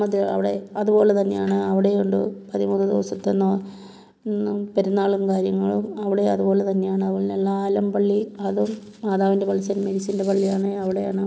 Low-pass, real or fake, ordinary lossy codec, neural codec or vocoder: none; real; none; none